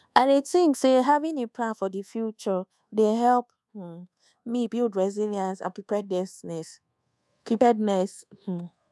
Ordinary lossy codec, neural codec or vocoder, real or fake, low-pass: none; codec, 24 kHz, 1.2 kbps, DualCodec; fake; none